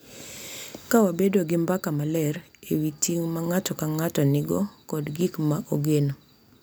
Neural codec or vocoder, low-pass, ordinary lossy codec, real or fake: none; none; none; real